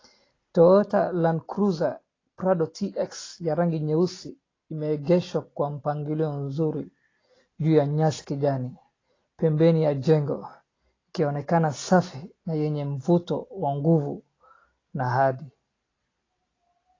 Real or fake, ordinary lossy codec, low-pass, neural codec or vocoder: real; AAC, 32 kbps; 7.2 kHz; none